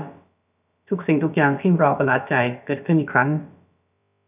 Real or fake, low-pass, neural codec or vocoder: fake; 3.6 kHz; codec, 16 kHz, about 1 kbps, DyCAST, with the encoder's durations